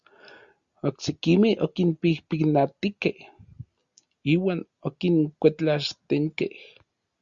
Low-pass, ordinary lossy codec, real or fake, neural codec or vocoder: 7.2 kHz; Opus, 64 kbps; real; none